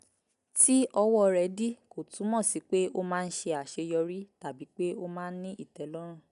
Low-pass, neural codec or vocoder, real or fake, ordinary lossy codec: 10.8 kHz; none; real; none